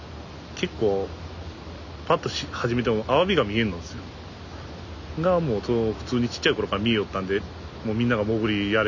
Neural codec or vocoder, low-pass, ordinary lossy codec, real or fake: none; 7.2 kHz; none; real